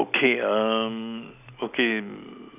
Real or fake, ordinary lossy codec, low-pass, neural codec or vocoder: real; none; 3.6 kHz; none